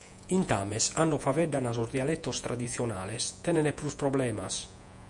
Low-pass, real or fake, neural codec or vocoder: 10.8 kHz; fake; vocoder, 48 kHz, 128 mel bands, Vocos